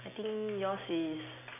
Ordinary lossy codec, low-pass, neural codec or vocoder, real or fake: MP3, 32 kbps; 3.6 kHz; none; real